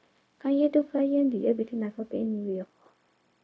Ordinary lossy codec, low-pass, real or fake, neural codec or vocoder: none; none; fake; codec, 16 kHz, 0.4 kbps, LongCat-Audio-Codec